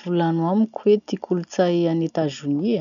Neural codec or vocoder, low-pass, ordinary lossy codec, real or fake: none; 7.2 kHz; none; real